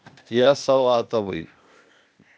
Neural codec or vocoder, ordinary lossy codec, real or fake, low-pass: codec, 16 kHz, 0.8 kbps, ZipCodec; none; fake; none